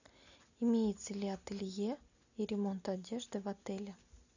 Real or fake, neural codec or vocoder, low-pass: real; none; 7.2 kHz